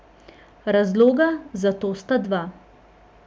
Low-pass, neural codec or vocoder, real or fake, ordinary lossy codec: none; none; real; none